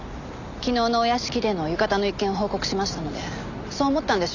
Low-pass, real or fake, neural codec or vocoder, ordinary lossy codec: 7.2 kHz; real; none; none